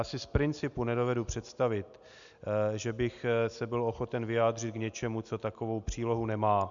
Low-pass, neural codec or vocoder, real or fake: 7.2 kHz; none; real